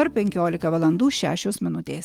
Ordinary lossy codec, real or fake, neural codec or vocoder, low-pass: Opus, 32 kbps; fake; autoencoder, 48 kHz, 128 numbers a frame, DAC-VAE, trained on Japanese speech; 19.8 kHz